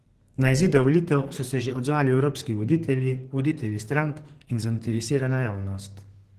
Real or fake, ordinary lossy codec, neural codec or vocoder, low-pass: fake; Opus, 16 kbps; codec, 32 kHz, 1.9 kbps, SNAC; 14.4 kHz